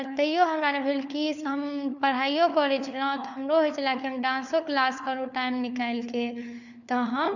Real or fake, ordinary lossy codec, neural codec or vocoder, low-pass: fake; none; codec, 16 kHz, 4 kbps, FunCodec, trained on LibriTTS, 50 frames a second; 7.2 kHz